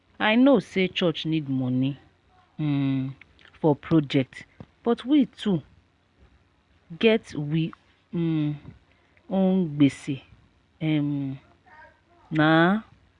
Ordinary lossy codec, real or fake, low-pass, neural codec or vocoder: Opus, 64 kbps; real; 10.8 kHz; none